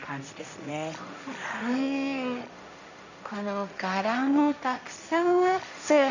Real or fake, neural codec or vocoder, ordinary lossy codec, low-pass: fake; codec, 16 kHz, 1.1 kbps, Voila-Tokenizer; none; 7.2 kHz